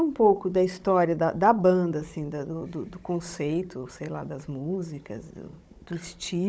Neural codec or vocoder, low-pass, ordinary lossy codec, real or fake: codec, 16 kHz, 16 kbps, FunCodec, trained on Chinese and English, 50 frames a second; none; none; fake